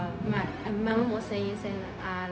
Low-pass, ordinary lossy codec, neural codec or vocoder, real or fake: none; none; codec, 16 kHz, 0.4 kbps, LongCat-Audio-Codec; fake